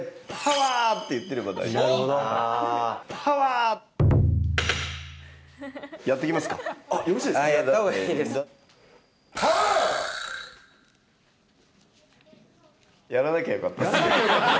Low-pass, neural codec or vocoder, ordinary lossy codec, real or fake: none; none; none; real